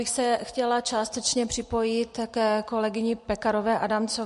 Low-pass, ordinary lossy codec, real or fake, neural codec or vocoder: 14.4 kHz; MP3, 48 kbps; real; none